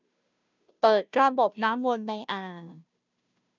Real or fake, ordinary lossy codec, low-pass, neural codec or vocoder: fake; none; 7.2 kHz; codec, 16 kHz, 0.5 kbps, FunCodec, trained on Chinese and English, 25 frames a second